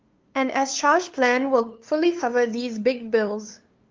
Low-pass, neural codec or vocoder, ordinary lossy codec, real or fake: 7.2 kHz; codec, 16 kHz, 2 kbps, FunCodec, trained on LibriTTS, 25 frames a second; Opus, 32 kbps; fake